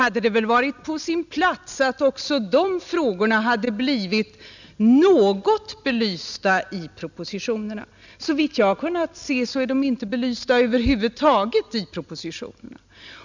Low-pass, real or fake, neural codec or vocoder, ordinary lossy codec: 7.2 kHz; real; none; none